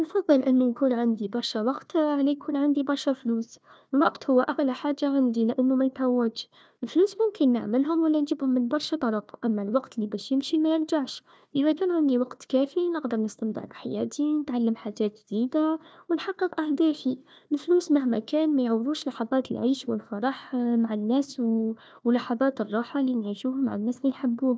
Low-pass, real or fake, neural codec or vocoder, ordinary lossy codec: none; fake; codec, 16 kHz, 1 kbps, FunCodec, trained on Chinese and English, 50 frames a second; none